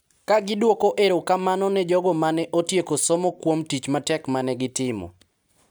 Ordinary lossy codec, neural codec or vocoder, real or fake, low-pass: none; none; real; none